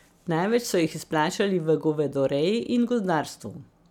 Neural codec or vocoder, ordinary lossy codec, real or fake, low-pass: none; none; real; 19.8 kHz